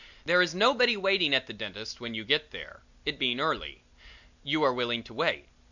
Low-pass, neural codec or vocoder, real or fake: 7.2 kHz; none; real